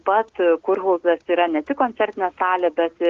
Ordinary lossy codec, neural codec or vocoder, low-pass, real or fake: Opus, 16 kbps; none; 7.2 kHz; real